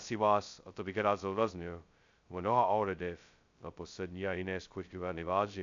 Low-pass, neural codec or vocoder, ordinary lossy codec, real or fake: 7.2 kHz; codec, 16 kHz, 0.2 kbps, FocalCodec; AAC, 64 kbps; fake